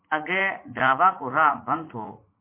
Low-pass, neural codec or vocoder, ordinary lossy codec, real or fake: 3.6 kHz; vocoder, 44.1 kHz, 80 mel bands, Vocos; MP3, 24 kbps; fake